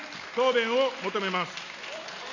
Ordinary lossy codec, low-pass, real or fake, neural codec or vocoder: none; 7.2 kHz; real; none